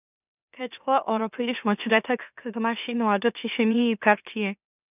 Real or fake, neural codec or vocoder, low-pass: fake; autoencoder, 44.1 kHz, a latent of 192 numbers a frame, MeloTTS; 3.6 kHz